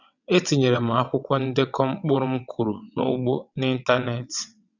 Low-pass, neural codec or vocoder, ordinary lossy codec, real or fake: 7.2 kHz; vocoder, 22.05 kHz, 80 mel bands, WaveNeXt; none; fake